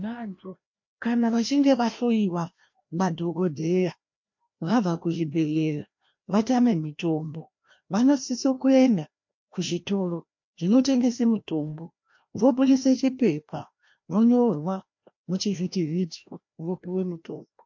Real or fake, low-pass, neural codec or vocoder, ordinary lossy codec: fake; 7.2 kHz; codec, 16 kHz, 1 kbps, FreqCodec, larger model; MP3, 48 kbps